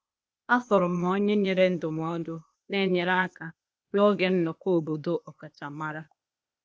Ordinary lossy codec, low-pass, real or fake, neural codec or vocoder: none; none; fake; codec, 16 kHz, 0.8 kbps, ZipCodec